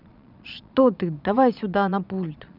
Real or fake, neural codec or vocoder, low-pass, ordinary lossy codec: fake; vocoder, 22.05 kHz, 80 mel bands, Vocos; 5.4 kHz; none